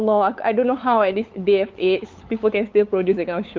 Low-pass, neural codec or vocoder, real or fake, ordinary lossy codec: 7.2 kHz; codec, 16 kHz, 8 kbps, FunCodec, trained on LibriTTS, 25 frames a second; fake; Opus, 24 kbps